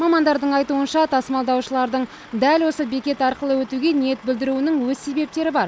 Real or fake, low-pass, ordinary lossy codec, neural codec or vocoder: real; none; none; none